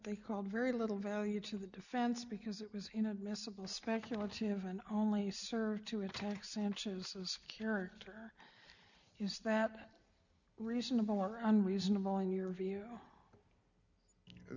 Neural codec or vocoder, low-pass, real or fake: none; 7.2 kHz; real